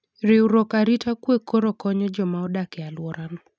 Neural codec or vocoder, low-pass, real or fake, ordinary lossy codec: none; none; real; none